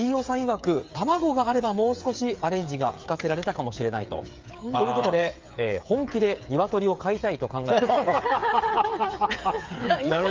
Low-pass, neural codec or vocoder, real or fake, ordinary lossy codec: 7.2 kHz; codec, 16 kHz, 8 kbps, FreqCodec, smaller model; fake; Opus, 32 kbps